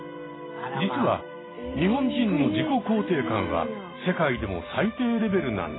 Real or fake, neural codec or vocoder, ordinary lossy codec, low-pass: real; none; AAC, 16 kbps; 7.2 kHz